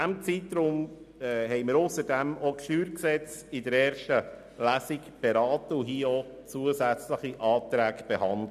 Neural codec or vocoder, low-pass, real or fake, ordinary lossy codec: none; 14.4 kHz; real; none